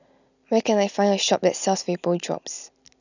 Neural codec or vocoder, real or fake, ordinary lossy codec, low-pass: none; real; none; 7.2 kHz